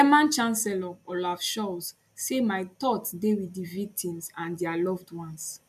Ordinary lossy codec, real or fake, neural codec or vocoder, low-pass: none; real; none; 14.4 kHz